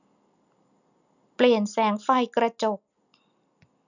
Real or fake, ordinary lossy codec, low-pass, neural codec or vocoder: real; none; 7.2 kHz; none